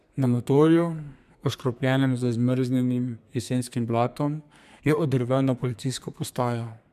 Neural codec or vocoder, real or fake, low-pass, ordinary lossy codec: codec, 32 kHz, 1.9 kbps, SNAC; fake; 14.4 kHz; none